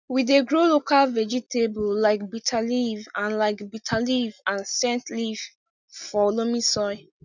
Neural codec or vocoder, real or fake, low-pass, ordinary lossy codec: none; real; 7.2 kHz; none